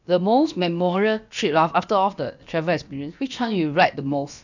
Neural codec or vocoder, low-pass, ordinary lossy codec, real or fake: codec, 16 kHz, about 1 kbps, DyCAST, with the encoder's durations; 7.2 kHz; none; fake